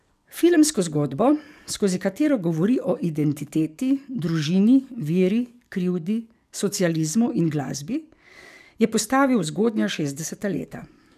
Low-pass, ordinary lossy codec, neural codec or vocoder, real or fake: 14.4 kHz; none; codec, 44.1 kHz, 7.8 kbps, DAC; fake